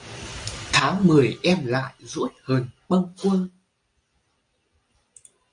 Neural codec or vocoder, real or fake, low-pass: none; real; 9.9 kHz